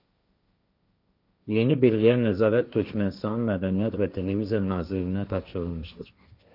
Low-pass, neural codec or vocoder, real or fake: 5.4 kHz; codec, 16 kHz, 1.1 kbps, Voila-Tokenizer; fake